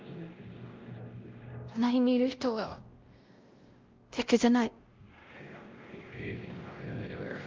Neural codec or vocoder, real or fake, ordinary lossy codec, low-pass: codec, 16 kHz, 0.5 kbps, X-Codec, WavLM features, trained on Multilingual LibriSpeech; fake; Opus, 32 kbps; 7.2 kHz